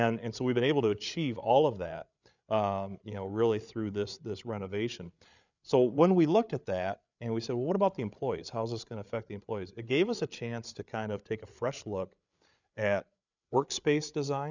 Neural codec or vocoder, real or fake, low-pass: codec, 16 kHz, 8 kbps, FreqCodec, larger model; fake; 7.2 kHz